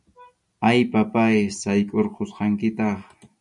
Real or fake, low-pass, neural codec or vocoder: real; 10.8 kHz; none